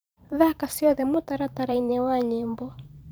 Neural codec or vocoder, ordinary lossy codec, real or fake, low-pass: vocoder, 44.1 kHz, 128 mel bands every 512 samples, BigVGAN v2; none; fake; none